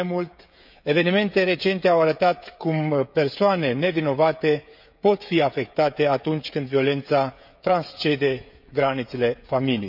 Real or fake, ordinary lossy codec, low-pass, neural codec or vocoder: fake; none; 5.4 kHz; codec, 16 kHz, 16 kbps, FreqCodec, smaller model